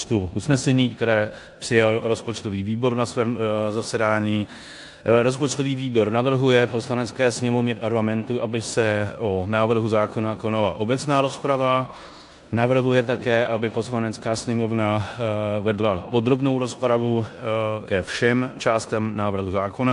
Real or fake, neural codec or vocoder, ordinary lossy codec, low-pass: fake; codec, 16 kHz in and 24 kHz out, 0.9 kbps, LongCat-Audio-Codec, four codebook decoder; AAC, 64 kbps; 10.8 kHz